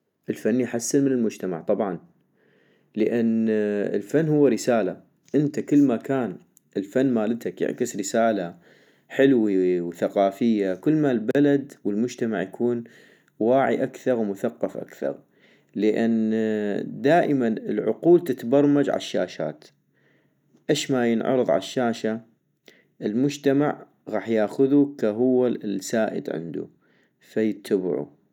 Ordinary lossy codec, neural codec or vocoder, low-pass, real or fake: none; none; 19.8 kHz; real